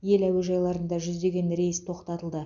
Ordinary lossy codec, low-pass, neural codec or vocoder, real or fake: none; 7.2 kHz; none; real